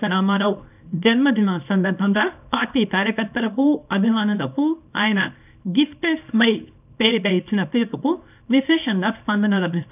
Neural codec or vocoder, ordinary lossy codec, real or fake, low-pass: codec, 24 kHz, 0.9 kbps, WavTokenizer, small release; none; fake; 3.6 kHz